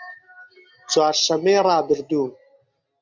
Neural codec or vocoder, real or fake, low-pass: none; real; 7.2 kHz